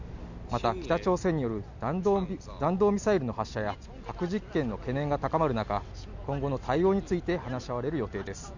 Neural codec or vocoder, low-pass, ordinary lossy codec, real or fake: none; 7.2 kHz; none; real